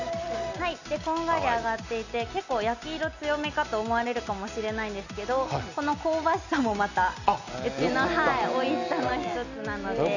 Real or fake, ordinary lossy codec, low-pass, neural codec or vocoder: real; none; 7.2 kHz; none